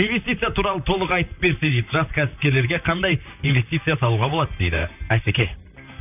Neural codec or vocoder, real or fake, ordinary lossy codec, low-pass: vocoder, 44.1 kHz, 128 mel bands, Pupu-Vocoder; fake; none; 3.6 kHz